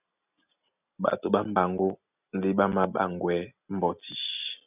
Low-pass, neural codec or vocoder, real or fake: 3.6 kHz; none; real